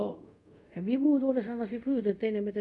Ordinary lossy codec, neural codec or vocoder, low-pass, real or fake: none; codec, 24 kHz, 0.5 kbps, DualCodec; 10.8 kHz; fake